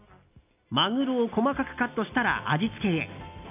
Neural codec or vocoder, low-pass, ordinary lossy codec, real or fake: none; 3.6 kHz; none; real